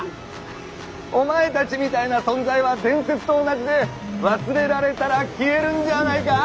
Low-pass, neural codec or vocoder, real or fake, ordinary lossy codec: none; none; real; none